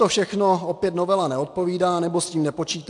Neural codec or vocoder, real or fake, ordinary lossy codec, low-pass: none; real; AAC, 64 kbps; 10.8 kHz